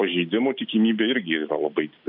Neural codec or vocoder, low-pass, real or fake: none; 5.4 kHz; real